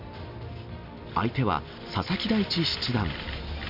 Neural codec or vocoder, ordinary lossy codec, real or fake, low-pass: none; none; real; 5.4 kHz